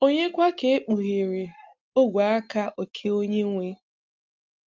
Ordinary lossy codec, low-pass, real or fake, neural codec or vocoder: Opus, 32 kbps; 7.2 kHz; real; none